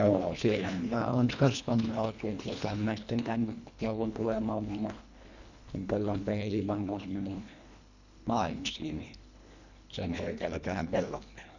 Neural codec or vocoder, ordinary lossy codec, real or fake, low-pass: codec, 24 kHz, 1.5 kbps, HILCodec; none; fake; 7.2 kHz